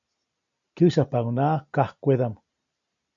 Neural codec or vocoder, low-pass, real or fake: none; 7.2 kHz; real